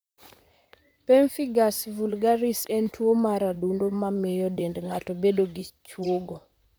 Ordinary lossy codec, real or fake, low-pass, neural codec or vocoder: none; fake; none; vocoder, 44.1 kHz, 128 mel bands, Pupu-Vocoder